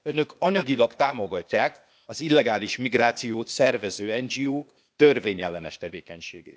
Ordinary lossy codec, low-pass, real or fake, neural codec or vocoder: none; none; fake; codec, 16 kHz, 0.8 kbps, ZipCodec